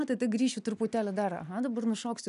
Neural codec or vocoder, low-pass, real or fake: none; 10.8 kHz; real